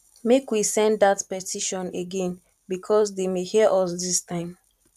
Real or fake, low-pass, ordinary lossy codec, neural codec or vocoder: fake; 14.4 kHz; none; vocoder, 44.1 kHz, 128 mel bands every 512 samples, BigVGAN v2